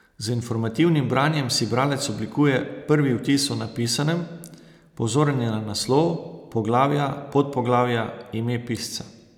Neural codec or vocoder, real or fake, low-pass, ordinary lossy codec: none; real; 19.8 kHz; none